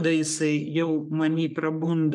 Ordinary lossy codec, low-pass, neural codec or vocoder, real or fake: MP3, 96 kbps; 10.8 kHz; codec, 44.1 kHz, 3.4 kbps, Pupu-Codec; fake